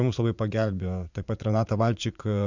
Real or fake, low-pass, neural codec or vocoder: fake; 7.2 kHz; vocoder, 44.1 kHz, 80 mel bands, Vocos